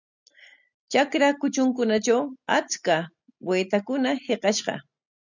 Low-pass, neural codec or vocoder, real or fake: 7.2 kHz; none; real